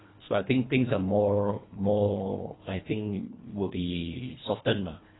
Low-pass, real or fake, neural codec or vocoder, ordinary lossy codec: 7.2 kHz; fake; codec, 24 kHz, 1.5 kbps, HILCodec; AAC, 16 kbps